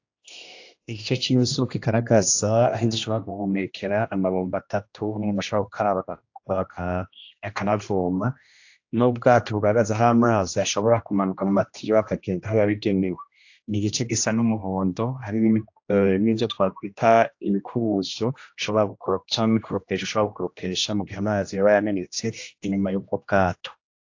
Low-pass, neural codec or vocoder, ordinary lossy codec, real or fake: 7.2 kHz; codec, 16 kHz, 1 kbps, X-Codec, HuBERT features, trained on general audio; AAC, 48 kbps; fake